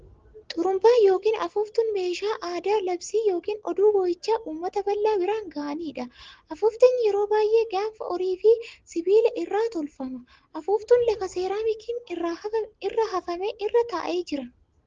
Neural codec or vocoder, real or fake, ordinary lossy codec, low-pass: none; real; Opus, 16 kbps; 7.2 kHz